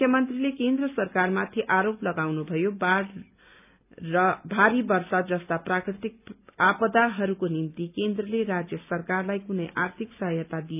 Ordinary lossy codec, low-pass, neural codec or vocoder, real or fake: none; 3.6 kHz; none; real